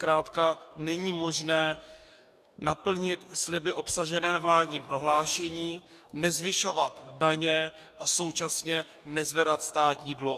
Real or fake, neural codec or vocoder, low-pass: fake; codec, 44.1 kHz, 2.6 kbps, DAC; 14.4 kHz